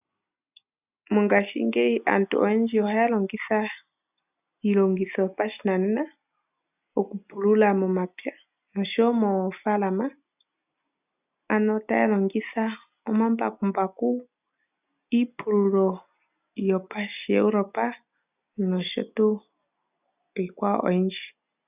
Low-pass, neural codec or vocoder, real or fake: 3.6 kHz; none; real